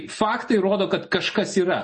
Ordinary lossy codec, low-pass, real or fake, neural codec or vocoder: MP3, 32 kbps; 10.8 kHz; real; none